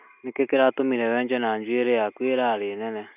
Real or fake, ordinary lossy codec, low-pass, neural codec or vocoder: real; none; 3.6 kHz; none